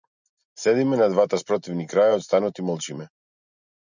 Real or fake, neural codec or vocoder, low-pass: real; none; 7.2 kHz